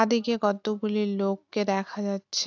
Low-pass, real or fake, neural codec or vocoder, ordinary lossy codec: 7.2 kHz; real; none; AAC, 48 kbps